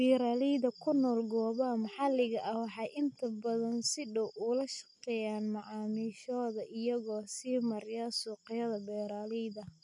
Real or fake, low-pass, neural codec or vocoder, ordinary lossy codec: real; 10.8 kHz; none; MP3, 64 kbps